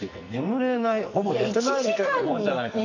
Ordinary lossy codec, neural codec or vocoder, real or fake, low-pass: none; codec, 44.1 kHz, 2.6 kbps, SNAC; fake; 7.2 kHz